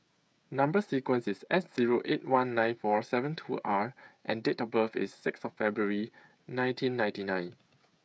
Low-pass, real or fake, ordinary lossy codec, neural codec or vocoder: none; fake; none; codec, 16 kHz, 16 kbps, FreqCodec, smaller model